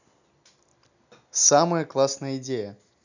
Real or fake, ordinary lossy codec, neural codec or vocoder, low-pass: real; none; none; 7.2 kHz